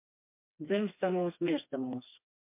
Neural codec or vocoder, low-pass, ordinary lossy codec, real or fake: codec, 16 kHz, 2 kbps, FreqCodec, smaller model; 3.6 kHz; MP3, 32 kbps; fake